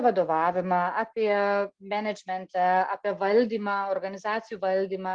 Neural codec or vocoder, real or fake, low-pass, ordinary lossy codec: none; real; 9.9 kHz; Opus, 24 kbps